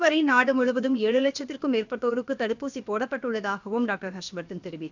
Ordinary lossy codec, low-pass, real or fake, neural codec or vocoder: none; 7.2 kHz; fake; codec, 16 kHz, about 1 kbps, DyCAST, with the encoder's durations